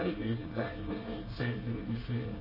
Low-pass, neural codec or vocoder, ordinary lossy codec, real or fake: 5.4 kHz; codec, 24 kHz, 1 kbps, SNAC; MP3, 24 kbps; fake